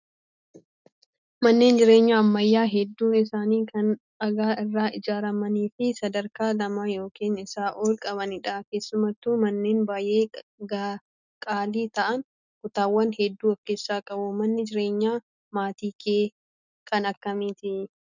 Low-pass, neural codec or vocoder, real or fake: 7.2 kHz; none; real